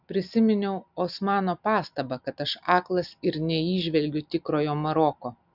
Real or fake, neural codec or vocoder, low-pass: real; none; 5.4 kHz